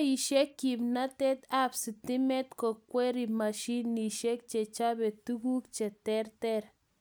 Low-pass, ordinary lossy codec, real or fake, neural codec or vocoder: none; none; real; none